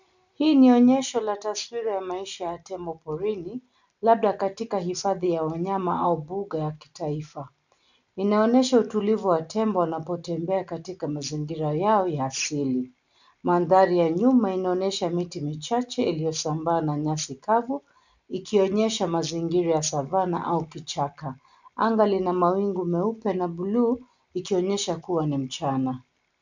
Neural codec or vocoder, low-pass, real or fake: none; 7.2 kHz; real